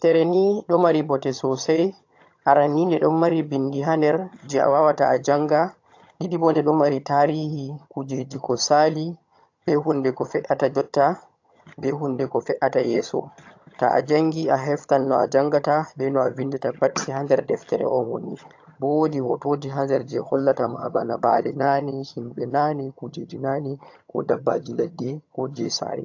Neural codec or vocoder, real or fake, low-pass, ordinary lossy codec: vocoder, 22.05 kHz, 80 mel bands, HiFi-GAN; fake; 7.2 kHz; AAC, 48 kbps